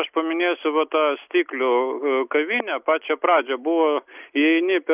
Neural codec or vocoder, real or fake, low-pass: none; real; 3.6 kHz